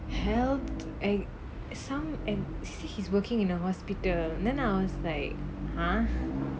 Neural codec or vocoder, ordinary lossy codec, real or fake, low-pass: none; none; real; none